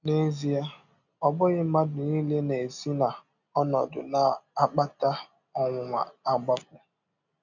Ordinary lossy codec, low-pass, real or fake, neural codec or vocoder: none; 7.2 kHz; real; none